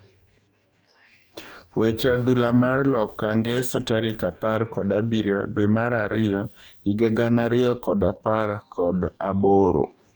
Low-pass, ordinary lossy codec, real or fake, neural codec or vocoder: none; none; fake; codec, 44.1 kHz, 2.6 kbps, DAC